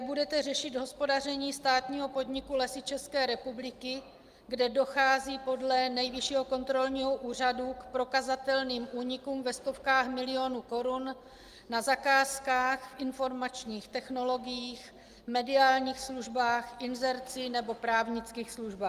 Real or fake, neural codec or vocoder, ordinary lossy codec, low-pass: real; none; Opus, 32 kbps; 14.4 kHz